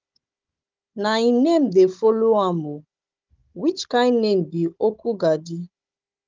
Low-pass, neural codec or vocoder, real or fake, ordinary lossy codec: 7.2 kHz; codec, 16 kHz, 16 kbps, FunCodec, trained on Chinese and English, 50 frames a second; fake; Opus, 24 kbps